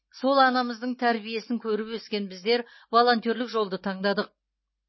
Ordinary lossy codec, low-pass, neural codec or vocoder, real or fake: MP3, 24 kbps; 7.2 kHz; none; real